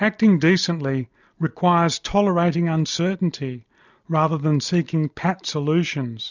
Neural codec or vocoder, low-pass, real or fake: none; 7.2 kHz; real